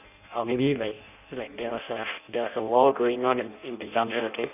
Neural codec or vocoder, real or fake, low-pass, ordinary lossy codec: codec, 16 kHz in and 24 kHz out, 0.6 kbps, FireRedTTS-2 codec; fake; 3.6 kHz; none